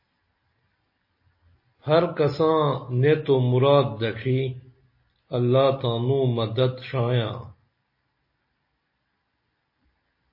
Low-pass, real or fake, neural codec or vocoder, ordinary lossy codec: 5.4 kHz; real; none; MP3, 24 kbps